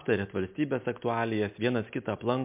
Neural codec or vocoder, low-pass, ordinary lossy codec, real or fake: none; 3.6 kHz; MP3, 32 kbps; real